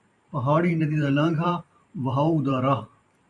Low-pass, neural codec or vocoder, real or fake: 10.8 kHz; vocoder, 44.1 kHz, 128 mel bands every 512 samples, BigVGAN v2; fake